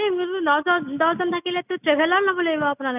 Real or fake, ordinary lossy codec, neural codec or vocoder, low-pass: real; none; none; 3.6 kHz